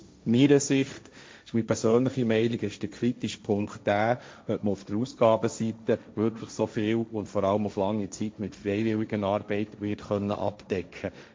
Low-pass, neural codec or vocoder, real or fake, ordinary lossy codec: none; codec, 16 kHz, 1.1 kbps, Voila-Tokenizer; fake; none